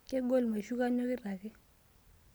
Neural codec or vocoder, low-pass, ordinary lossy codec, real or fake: none; none; none; real